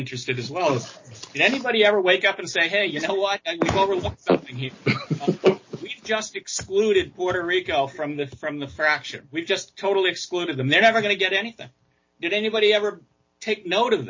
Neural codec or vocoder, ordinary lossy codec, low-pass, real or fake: none; MP3, 32 kbps; 7.2 kHz; real